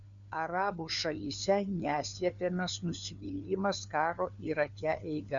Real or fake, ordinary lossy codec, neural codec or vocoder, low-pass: fake; MP3, 96 kbps; codec, 16 kHz, 4 kbps, FunCodec, trained on LibriTTS, 50 frames a second; 7.2 kHz